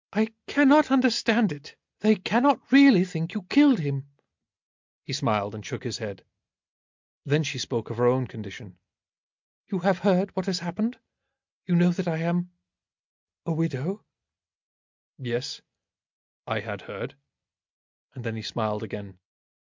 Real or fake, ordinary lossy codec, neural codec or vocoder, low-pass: real; MP3, 64 kbps; none; 7.2 kHz